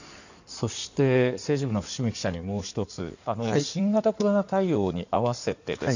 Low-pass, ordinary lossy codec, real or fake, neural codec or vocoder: 7.2 kHz; none; fake; codec, 16 kHz in and 24 kHz out, 2.2 kbps, FireRedTTS-2 codec